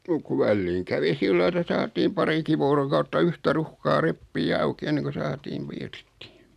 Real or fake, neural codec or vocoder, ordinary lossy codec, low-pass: real; none; none; 14.4 kHz